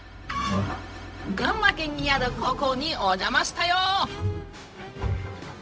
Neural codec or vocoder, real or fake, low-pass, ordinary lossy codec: codec, 16 kHz, 0.4 kbps, LongCat-Audio-Codec; fake; none; none